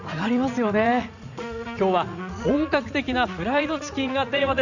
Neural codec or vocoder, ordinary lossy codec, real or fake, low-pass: vocoder, 44.1 kHz, 80 mel bands, Vocos; none; fake; 7.2 kHz